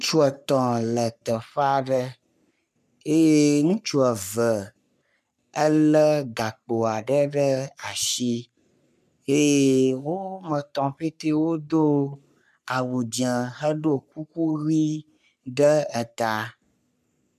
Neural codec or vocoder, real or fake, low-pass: codec, 44.1 kHz, 3.4 kbps, Pupu-Codec; fake; 14.4 kHz